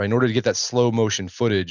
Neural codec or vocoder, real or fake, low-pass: none; real; 7.2 kHz